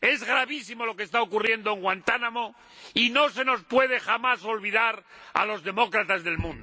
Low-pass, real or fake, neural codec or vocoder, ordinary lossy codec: none; real; none; none